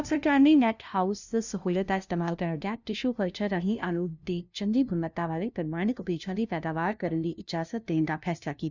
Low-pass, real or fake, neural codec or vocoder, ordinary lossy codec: 7.2 kHz; fake; codec, 16 kHz, 0.5 kbps, FunCodec, trained on LibriTTS, 25 frames a second; none